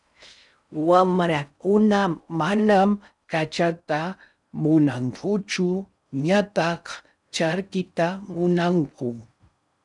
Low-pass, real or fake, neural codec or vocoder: 10.8 kHz; fake; codec, 16 kHz in and 24 kHz out, 0.6 kbps, FocalCodec, streaming, 4096 codes